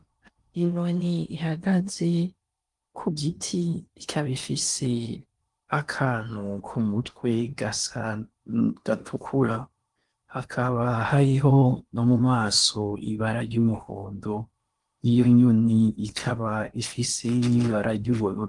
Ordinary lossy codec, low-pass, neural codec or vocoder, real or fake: Opus, 32 kbps; 10.8 kHz; codec, 16 kHz in and 24 kHz out, 0.8 kbps, FocalCodec, streaming, 65536 codes; fake